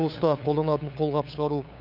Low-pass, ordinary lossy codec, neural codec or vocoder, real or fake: 5.4 kHz; none; codec, 16 kHz, 8 kbps, FunCodec, trained on LibriTTS, 25 frames a second; fake